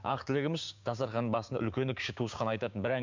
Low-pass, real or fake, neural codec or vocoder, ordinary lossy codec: 7.2 kHz; fake; codec, 16 kHz, 6 kbps, DAC; MP3, 64 kbps